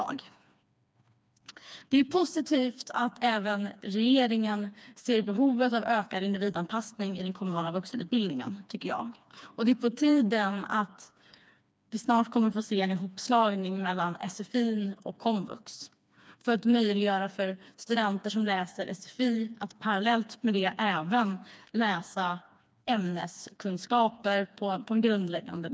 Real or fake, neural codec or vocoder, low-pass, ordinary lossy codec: fake; codec, 16 kHz, 2 kbps, FreqCodec, smaller model; none; none